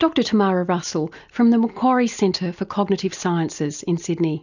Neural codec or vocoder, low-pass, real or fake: none; 7.2 kHz; real